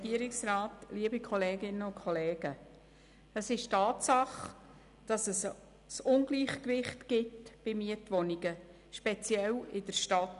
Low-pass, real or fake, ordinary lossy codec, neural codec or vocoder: 10.8 kHz; real; none; none